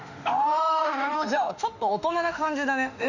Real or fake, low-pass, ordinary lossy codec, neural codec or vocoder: fake; 7.2 kHz; none; autoencoder, 48 kHz, 32 numbers a frame, DAC-VAE, trained on Japanese speech